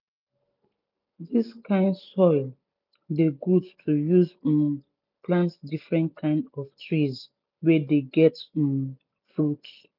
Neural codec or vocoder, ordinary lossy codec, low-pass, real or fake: none; none; 5.4 kHz; real